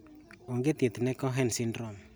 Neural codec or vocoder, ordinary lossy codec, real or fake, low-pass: none; none; real; none